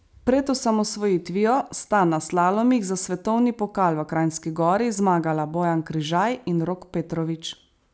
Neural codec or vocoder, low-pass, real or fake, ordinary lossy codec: none; none; real; none